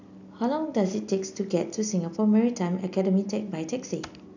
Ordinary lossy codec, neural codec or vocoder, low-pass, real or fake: none; none; 7.2 kHz; real